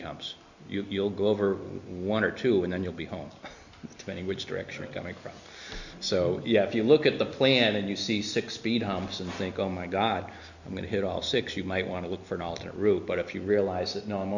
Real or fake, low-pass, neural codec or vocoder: real; 7.2 kHz; none